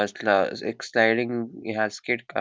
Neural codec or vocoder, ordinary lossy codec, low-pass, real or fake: none; none; none; real